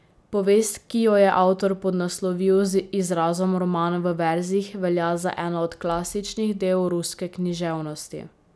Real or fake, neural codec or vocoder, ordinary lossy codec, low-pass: real; none; none; none